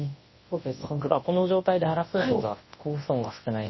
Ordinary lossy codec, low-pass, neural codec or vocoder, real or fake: MP3, 24 kbps; 7.2 kHz; codec, 24 kHz, 0.9 kbps, WavTokenizer, large speech release; fake